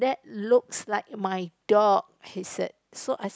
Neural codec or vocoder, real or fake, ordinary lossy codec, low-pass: none; real; none; none